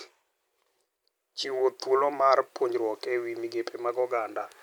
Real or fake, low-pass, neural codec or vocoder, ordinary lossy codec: real; none; none; none